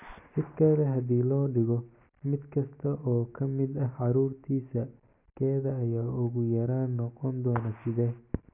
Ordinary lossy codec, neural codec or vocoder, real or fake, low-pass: none; none; real; 3.6 kHz